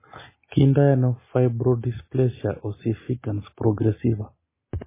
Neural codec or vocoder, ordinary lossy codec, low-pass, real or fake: none; MP3, 16 kbps; 3.6 kHz; real